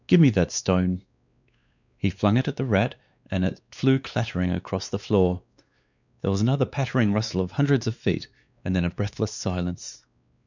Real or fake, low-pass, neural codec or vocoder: fake; 7.2 kHz; codec, 16 kHz, 2 kbps, X-Codec, WavLM features, trained on Multilingual LibriSpeech